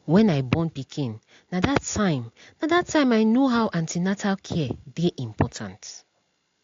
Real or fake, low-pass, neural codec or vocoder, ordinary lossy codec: real; 7.2 kHz; none; AAC, 48 kbps